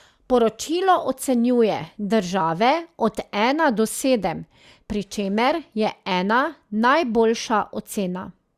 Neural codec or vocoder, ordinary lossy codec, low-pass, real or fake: none; Opus, 64 kbps; 14.4 kHz; real